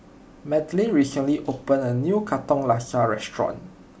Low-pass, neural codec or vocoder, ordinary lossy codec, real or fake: none; none; none; real